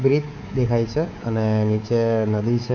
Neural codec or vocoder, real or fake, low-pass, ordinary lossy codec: codec, 24 kHz, 3.1 kbps, DualCodec; fake; 7.2 kHz; none